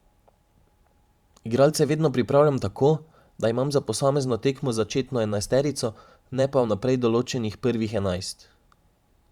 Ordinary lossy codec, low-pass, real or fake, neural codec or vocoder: Opus, 64 kbps; 19.8 kHz; fake; vocoder, 44.1 kHz, 128 mel bands every 512 samples, BigVGAN v2